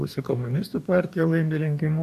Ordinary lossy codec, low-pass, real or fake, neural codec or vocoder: AAC, 96 kbps; 14.4 kHz; fake; codec, 44.1 kHz, 2.6 kbps, DAC